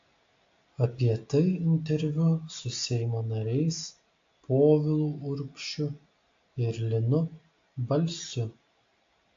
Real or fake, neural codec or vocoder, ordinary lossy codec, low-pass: real; none; AAC, 48 kbps; 7.2 kHz